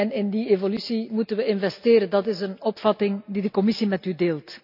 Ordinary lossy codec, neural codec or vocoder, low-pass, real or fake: none; none; 5.4 kHz; real